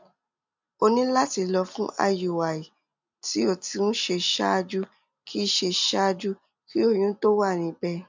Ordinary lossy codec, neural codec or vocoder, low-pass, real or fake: MP3, 64 kbps; none; 7.2 kHz; real